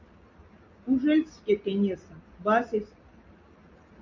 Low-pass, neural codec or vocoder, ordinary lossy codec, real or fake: 7.2 kHz; none; MP3, 48 kbps; real